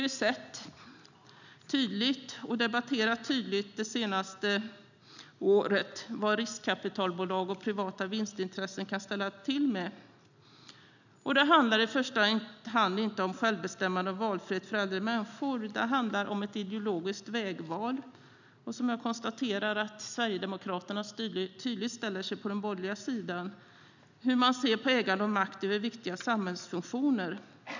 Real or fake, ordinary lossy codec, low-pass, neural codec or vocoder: real; none; 7.2 kHz; none